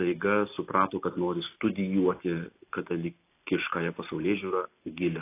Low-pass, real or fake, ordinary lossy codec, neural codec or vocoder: 3.6 kHz; real; AAC, 24 kbps; none